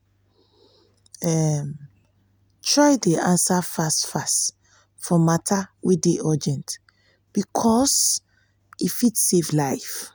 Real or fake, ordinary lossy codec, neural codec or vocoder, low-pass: real; none; none; none